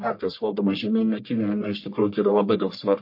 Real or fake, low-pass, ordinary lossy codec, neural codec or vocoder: fake; 5.4 kHz; MP3, 32 kbps; codec, 44.1 kHz, 1.7 kbps, Pupu-Codec